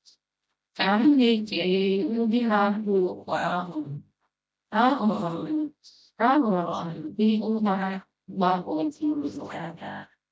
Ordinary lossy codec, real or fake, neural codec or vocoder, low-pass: none; fake; codec, 16 kHz, 0.5 kbps, FreqCodec, smaller model; none